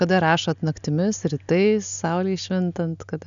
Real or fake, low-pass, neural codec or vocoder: real; 7.2 kHz; none